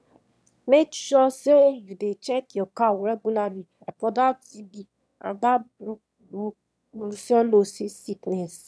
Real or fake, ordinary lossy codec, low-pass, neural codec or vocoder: fake; none; none; autoencoder, 22.05 kHz, a latent of 192 numbers a frame, VITS, trained on one speaker